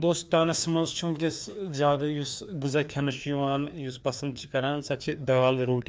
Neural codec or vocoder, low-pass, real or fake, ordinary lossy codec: codec, 16 kHz, 2 kbps, FreqCodec, larger model; none; fake; none